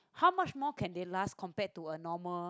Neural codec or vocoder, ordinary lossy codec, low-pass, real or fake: none; none; none; real